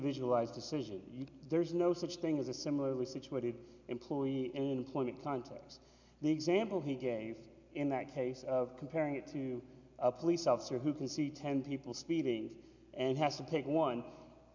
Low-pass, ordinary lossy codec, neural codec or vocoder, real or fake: 7.2 kHz; MP3, 64 kbps; none; real